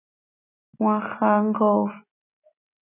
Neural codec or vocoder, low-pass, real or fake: none; 3.6 kHz; real